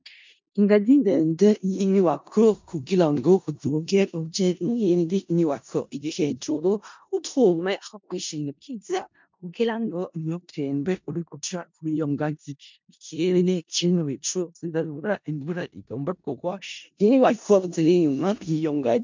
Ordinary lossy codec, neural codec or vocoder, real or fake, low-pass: MP3, 64 kbps; codec, 16 kHz in and 24 kHz out, 0.4 kbps, LongCat-Audio-Codec, four codebook decoder; fake; 7.2 kHz